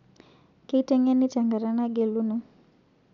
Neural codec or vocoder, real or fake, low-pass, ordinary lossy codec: none; real; 7.2 kHz; none